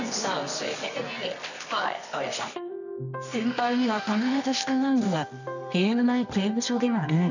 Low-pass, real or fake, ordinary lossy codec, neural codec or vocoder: 7.2 kHz; fake; none; codec, 24 kHz, 0.9 kbps, WavTokenizer, medium music audio release